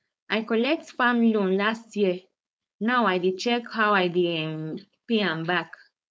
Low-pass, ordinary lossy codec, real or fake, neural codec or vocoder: none; none; fake; codec, 16 kHz, 4.8 kbps, FACodec